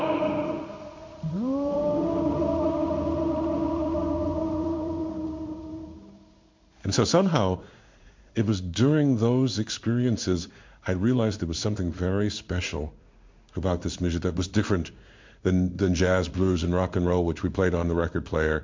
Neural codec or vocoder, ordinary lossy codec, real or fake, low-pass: codec, 16 kHz in and 24 kHz out, 1 kbps, XY-Tokenizer; MP3, 64 kbps; fake; 7.2 kHz